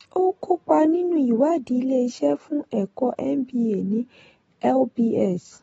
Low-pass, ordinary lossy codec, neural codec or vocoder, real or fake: 19.8 kHz; AAC, 24 kbps; none; real